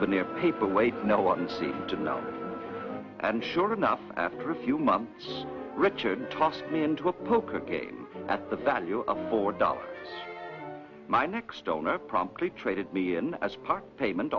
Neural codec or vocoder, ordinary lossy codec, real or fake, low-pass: none; MP3, 48 kbps; real; 7.2 kHz